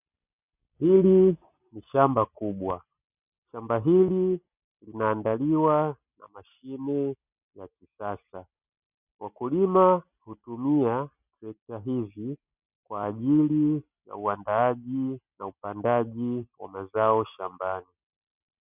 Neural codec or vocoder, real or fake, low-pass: none; real; 3.6 kHz